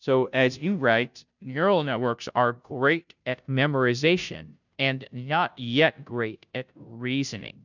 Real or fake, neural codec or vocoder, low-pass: fake; codec, 16 kHz, 0.5 kbps, FunCodec, trained on Chinese and English, 25 frames a second; 7.2 kHz